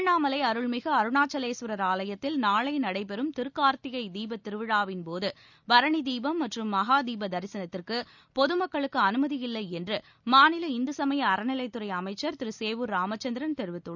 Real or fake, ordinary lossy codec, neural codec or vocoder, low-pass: real; none; none; 7.2 kHz